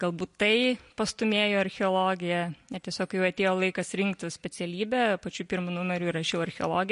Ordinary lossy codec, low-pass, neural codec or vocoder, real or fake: MP3, 48 kbps; 10.8 kHz; none; real